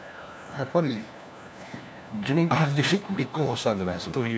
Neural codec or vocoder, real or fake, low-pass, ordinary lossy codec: codec, 16 kHz, 1 kbps, FunCodec, trained on LibriTTS, 50 frames a second; fake; none; none